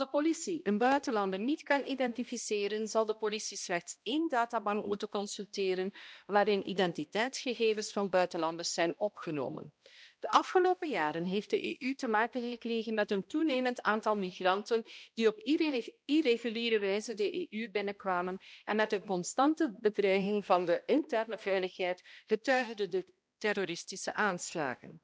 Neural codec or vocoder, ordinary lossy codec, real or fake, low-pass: codec, 16 kHz, 1 kbps, X-Codec, HuBERT features, trained on balanced general audio; none; fake; none